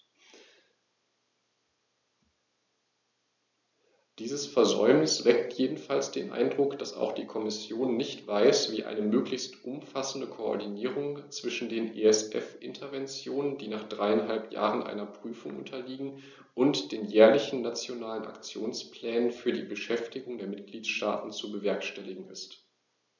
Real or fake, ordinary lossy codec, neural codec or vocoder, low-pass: real; none; none; 7.2 kHz